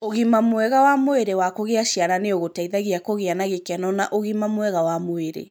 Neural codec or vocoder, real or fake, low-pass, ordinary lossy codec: none; real; none; none